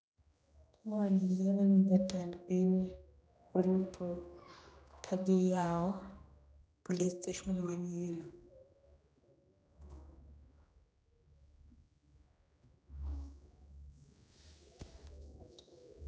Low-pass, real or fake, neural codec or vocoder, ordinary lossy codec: none; fake; codec, 16 kHz, 1 kbps, X-Codec, HuBERT features, trained on general audio; none